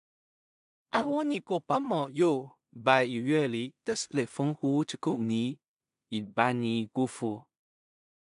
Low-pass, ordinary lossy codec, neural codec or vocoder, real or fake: 10.8 kHz; none; codec, 16 kHz in and 24 kHz out, 0.4 kbps, LongCat-Audio-Codec, two codebook decoder; fake